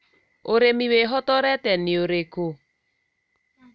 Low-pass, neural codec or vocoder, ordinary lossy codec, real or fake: none; none; none; real